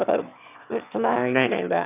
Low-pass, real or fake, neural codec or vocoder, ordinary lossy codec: 3.6 kHz; fake; autoencoder, 22.05 kHz, a latent of 192 numbers a frame, VITS, trained on one speaker; none